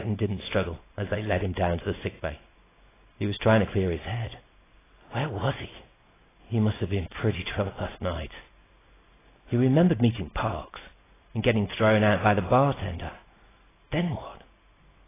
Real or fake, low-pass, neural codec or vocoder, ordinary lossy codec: real; 3.6 kHz; none; AAC, 16 kbps